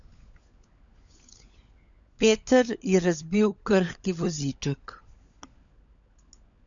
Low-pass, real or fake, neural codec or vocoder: 7.2 kHz; fake; codec, 16 kHz, 16 kbps, FunCodec, trained on LibriTTS, 50 frames a second